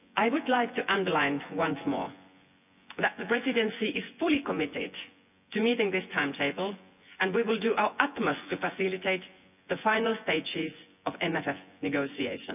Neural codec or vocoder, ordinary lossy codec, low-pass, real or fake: vocoder, 24 kHz, 100 mel bands, Vocos; none; 3.6 kHz; fake